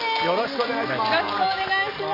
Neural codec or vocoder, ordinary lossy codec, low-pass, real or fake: none; AAC, 24 kbps; 5.4 kHz; real